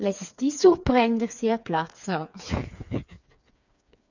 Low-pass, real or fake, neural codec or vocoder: 7.2 kHz; fake; codec, 16 kHz, 4 kbps, FreqCodec, smaller model